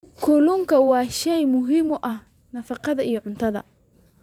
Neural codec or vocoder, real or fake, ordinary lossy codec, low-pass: vocoder, 44.1 kHz, 128 mel bands every 512 samples, BigVGAN v2; fake; none; 19.8 kHz